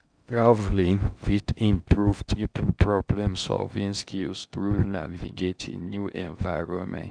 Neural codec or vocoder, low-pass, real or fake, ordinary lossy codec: codec, 16 kHz in and 24 kHz out, 0.6 kbps, FocalCodec, streaming, 4096 codes; 9.9 kHz; fake; none